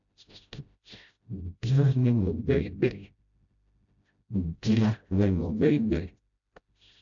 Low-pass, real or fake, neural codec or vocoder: 7.2 kHz; fake; codec, 16 kHz, 0.5 kbps, FreqCodec, smaller model